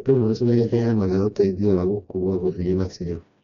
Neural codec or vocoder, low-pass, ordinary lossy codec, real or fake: codec, 16 kHz, 1 kbps, FreqCodec, smaller model; 7.2 kHz; none; fake